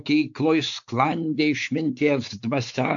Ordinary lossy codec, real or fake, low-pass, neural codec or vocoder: AAC, 64 kbps; fake; 7.2 kHz; codec, 16 kHz, 4 kbps, X-Codec, WavLM features, trained on Multilingual LibriSpeech